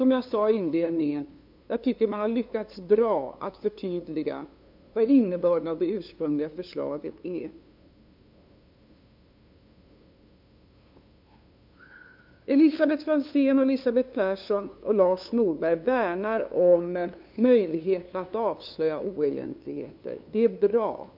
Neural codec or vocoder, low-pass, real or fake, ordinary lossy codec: codec, 16 kHz, 2 kbps, FunCodec, trained on LibriTTS, 25 frames a second; 5.4 kHz; fake; MP3, 48 kbps